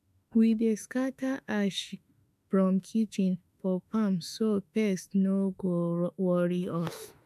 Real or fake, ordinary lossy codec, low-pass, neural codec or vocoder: fake; none; 14.4 kHz; autoencoder, 48 kHz, 32 numbers a frame, DAC-VAE, trained on Japanese speech